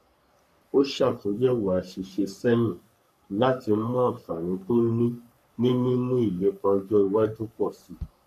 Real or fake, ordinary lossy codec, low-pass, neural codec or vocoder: fake; none; 14.4 kHz; codec, 44.1 kHz, 3.4 kbps, Pupu-Codec